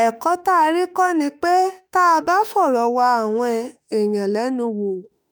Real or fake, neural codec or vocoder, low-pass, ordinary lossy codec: fake; autoencoder, 48 kHz, 32 numbers a frame, DAC-VAE, trained on Japanese speech; none; none